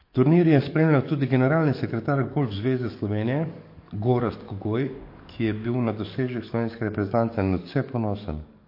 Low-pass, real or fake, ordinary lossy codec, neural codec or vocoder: 5.4 kHz; fake; MP3, 32 kbps; codec, 44.1 kHz, 7.8 kbps, DAC